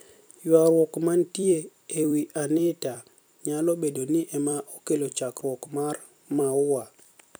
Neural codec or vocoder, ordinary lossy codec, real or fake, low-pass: vocoder, 44.1 kHz, 128 mel bands every 256 samples, BigVGAN v2; none; fake; none